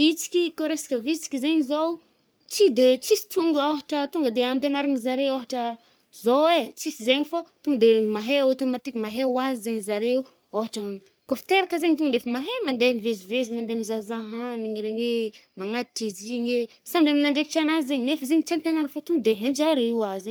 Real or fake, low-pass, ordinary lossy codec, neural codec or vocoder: fake; none; none; codec, 44.1 kHz, 3.4 kbps, Pupu-Codec